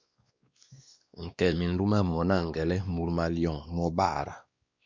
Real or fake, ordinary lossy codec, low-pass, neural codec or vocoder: fake; Opus, 64 kbps; 7.2 kHz; codec, 16 kHz, 2 kbps, X-Codec, WavLM features, trained on Multilingual LibriSpeech